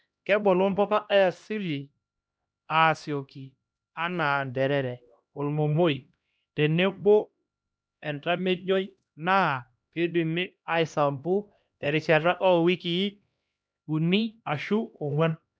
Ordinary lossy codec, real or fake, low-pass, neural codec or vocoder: none; fake; none; codec, 16 kHz, 1 kbps, X-Codec, HuBERT features, trained on LibriSpeech